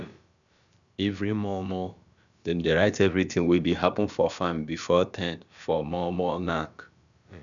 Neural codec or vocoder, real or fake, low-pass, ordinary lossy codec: codec, 16 kHz, about 1 kbps, DyCAST, with the encoder's durations; fake; 7.2 kHz; none